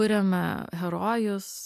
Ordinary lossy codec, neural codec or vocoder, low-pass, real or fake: MP3, 96 kbps; none; 14.4 kHz; real